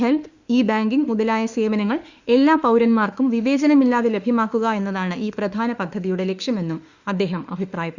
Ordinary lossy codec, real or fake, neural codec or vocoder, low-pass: Opus, 64 kbps; fake; autoencoder, 48 kHz, 32 numbers a frame, DAC-VAE, trained on Japanese speech; 7.2 kHz